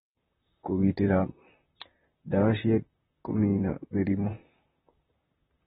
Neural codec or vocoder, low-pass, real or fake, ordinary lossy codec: vocoder, 44.1 kHz, 128 mel bands, Pupu-Vocoder; 19.8 kHz; fake; AAC, 16 kbps